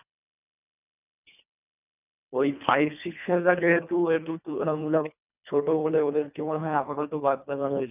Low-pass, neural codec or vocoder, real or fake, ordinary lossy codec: 3.6 kHz; codec, 24 kHz, 1.5 kbps, HILCodec; fake; none